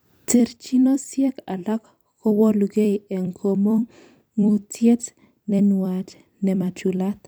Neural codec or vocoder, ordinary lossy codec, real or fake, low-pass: vocoder, 44.1 kHz, 128 mel bands every 256 samples, BigVGAN v2; none; fake; none